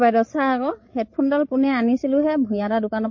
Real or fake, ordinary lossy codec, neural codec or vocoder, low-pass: fake; MP3, 32 kbps; codec, 16 kHz, 16 kbps, FreqCodec, larger model; 7.2 kHz